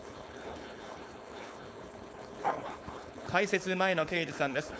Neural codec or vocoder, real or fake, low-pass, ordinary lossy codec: codec, 16 kHz, 4.8 kbps, FACodec; fake; none; none